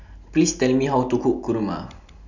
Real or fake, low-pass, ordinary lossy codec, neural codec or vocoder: real; 7.2 kHz; none; none